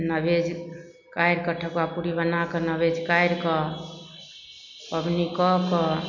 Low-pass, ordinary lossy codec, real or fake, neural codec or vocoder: 7.2 kHz; none; real; none